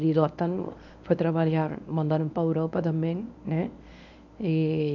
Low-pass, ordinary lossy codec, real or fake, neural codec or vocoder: 7.2 kHz; none; fake; codec, 24 kHz, 0.9 kbps, WavTokenizer, medium speech release version 1